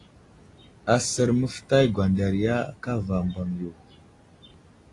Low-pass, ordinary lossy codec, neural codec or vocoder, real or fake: 10.8 kHz; AAC, 32 kbps; none; real